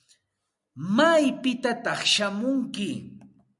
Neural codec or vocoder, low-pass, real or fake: none; 10.8 kHz; real